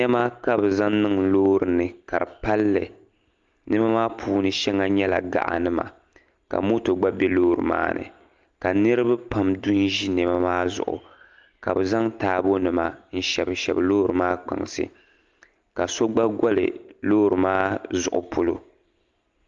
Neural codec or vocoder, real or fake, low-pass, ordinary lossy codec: none; real; 7.2 kHz; Opus, 32 kbps